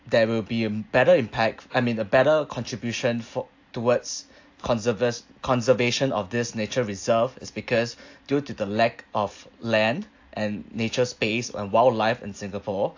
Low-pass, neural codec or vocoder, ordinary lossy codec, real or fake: 7.2 kHz; none; AAC, 48 kbps; real